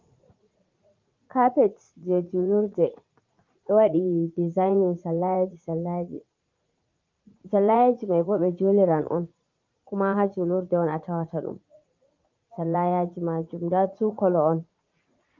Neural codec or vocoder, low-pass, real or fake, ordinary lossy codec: vocoder, 44.1 kHz, 80 mel bands, Vocos; 7.2 kHz; fake; Opus, 32 kbps